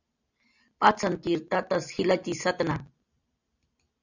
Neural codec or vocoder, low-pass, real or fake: none; 7.2 kHz; real